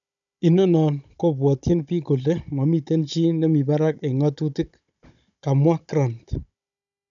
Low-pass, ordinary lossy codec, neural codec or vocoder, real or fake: 7.2 kHz; none; codec, 16 kHz, 16 kbps, FunCodec, trained on Chinese and English, 50 frames a second; fake